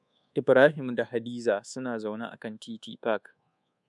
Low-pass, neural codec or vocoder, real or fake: 10.8 kHz; codec, 24 kHz, 1.2 kbps, DualCodec; fake